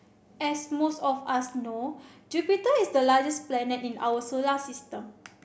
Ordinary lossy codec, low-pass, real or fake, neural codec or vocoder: none; none; real; none